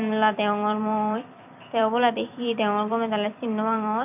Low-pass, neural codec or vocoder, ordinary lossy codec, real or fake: 3.6 kHz; vocoder, 44.1 kHz, 128 mel bands every 256 samples, BigVGAN v2; none; fake